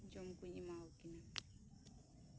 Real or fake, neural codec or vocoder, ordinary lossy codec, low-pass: real; none; none; none